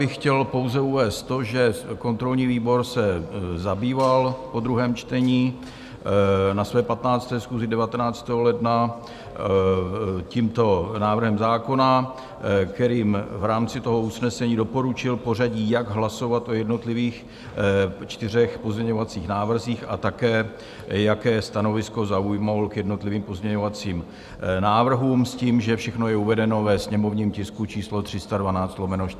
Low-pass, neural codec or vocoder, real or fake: 14.4 kHz; none; real